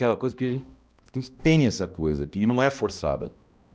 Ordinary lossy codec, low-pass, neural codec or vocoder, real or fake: none; none; codec, 16 kHz, 1 kbps, X-Codec, HuBERT features, trained on balanced general audio; fake